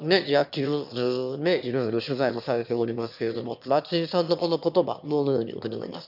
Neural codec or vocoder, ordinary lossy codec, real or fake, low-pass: autoencoder, 22.05 kHz, a latent of 192 numbers a frame, VITS, trained on one speaker; none; fake; 5.4 kHz